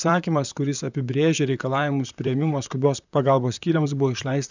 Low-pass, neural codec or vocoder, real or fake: 7.2 kHz; vocoder, 44.1 kHz, 128 mel bands, Pupu-Vocoder; fake